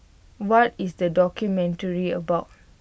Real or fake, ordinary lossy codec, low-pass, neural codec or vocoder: real; none; none; none